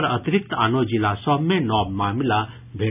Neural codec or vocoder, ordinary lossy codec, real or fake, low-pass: none; none; real; 3.6 kHz